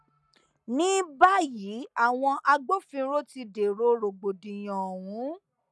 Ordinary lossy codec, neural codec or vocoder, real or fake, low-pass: none; none; real; none